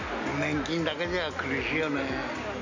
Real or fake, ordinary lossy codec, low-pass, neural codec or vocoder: real; MP3, 48 kbps; 7.2 kHz; none